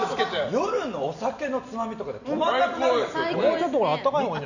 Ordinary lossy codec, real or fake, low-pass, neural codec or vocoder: none; real; 7.2 kHz; none